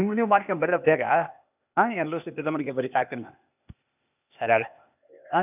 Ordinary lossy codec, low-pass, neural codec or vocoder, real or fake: none; 3.6 kHz; codec, 16 kHz, 0.8 kbps, ZipCodec; fake